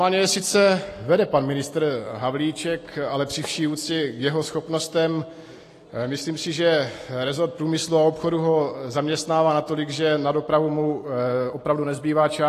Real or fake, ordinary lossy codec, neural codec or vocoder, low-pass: real; AAC, 48 kbps; none; 14.4 kHz